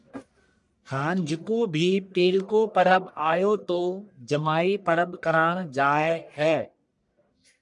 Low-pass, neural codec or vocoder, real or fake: 10.8 kHz; codec, 44.1 kHz, 1.7 kbps, Pupu-Codec; fake